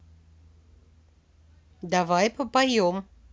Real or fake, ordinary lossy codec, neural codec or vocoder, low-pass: real; none; none; none